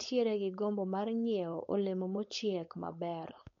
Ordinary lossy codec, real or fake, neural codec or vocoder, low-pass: MP3, 48 kbps; fake; codec, 16 kHz, 4.8 kbps, FACodec; 7.2 kHz